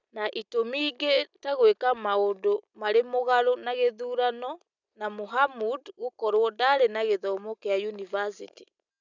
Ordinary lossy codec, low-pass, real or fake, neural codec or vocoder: none; 7.2 kHz; real; none